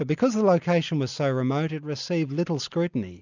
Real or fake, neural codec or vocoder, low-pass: real; none; 7.2 kHz